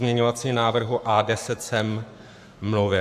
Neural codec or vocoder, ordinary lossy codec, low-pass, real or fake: codec, 44.1 kHz, 7.8 kbps, Pupu-Codec; AAC, 96 kbps; 14.4 kHz; fake